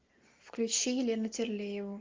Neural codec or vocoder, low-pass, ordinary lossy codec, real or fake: none; 7.2 kHz; Opus, 24 kbps; real